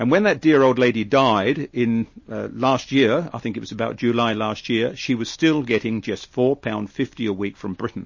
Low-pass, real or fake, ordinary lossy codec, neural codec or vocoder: 7.2 kHz; real; MP3, 32 kbps; none